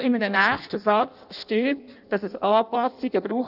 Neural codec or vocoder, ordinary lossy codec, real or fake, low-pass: codec, 16 kHz in and 24 kHz out, 0.6 kbps, FireRedTTS-2 codec; none; fake; 5.4 kHz